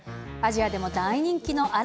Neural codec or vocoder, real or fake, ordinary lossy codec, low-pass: none; real; none; none